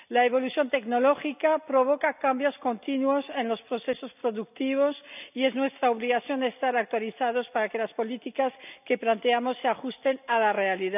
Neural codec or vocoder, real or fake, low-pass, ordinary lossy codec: none; real; 3.6 kHz; none